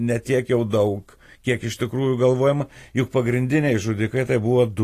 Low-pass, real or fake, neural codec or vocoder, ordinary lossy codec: 14.4 kHz; real; none; AAC, 48 kbps